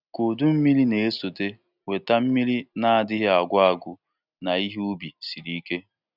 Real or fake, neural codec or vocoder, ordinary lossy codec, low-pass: real; none; none; 5.4 kHz